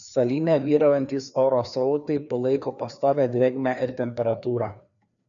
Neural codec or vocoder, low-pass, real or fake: codec, 16 kHz, 2 kbps, FreqCodec, larger model; 7.2 kHz; fake